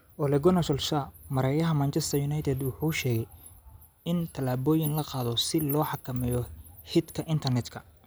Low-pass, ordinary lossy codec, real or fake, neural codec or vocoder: none; none; real; none